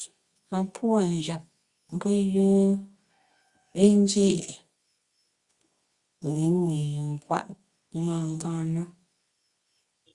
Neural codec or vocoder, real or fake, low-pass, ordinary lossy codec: codec, 24 kHz, 0.9 kbps, WavTokenizer, medium music audio release; fake; 10.8 kHz; Opus, 64 kbps